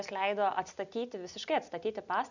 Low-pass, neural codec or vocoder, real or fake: 7.2 kHz; none; real